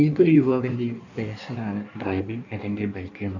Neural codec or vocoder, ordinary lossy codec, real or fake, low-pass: codec, 16 kHz in and 24 kHz out, 1.1 kbps, FireRedTTS-2 codec; none; fake; 7.2 kHz